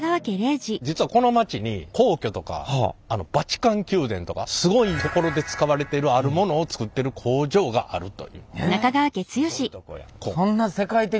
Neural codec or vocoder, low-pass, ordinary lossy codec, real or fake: none; none; none; real